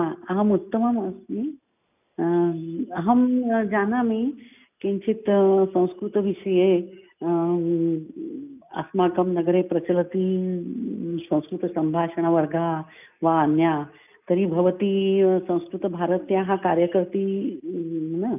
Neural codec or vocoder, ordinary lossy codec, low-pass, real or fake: none; none; 3.6 kHz; real